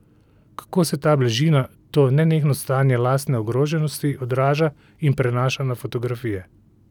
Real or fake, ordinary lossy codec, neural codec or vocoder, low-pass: real; none; none; 19.8 kHz